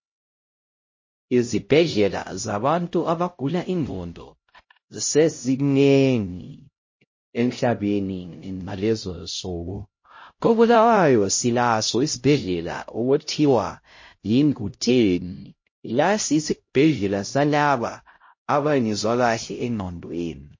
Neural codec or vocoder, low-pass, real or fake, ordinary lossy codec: codec, 16 kHz, 0.5 kbps, X-Codec, HuBERT features, trained on LibriSpeech; 7.2 kHz; fake; MP3, 32 kbps